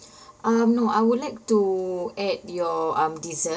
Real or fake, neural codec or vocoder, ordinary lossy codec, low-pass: real; none; none; none